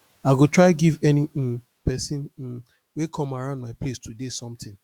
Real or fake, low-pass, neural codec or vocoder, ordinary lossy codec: fake; 19.8 kHz; autoencoder, 48 kHz, 128 numbers a frame, DAC-VAE, trained on Japanese speech; Opus, 64 kbps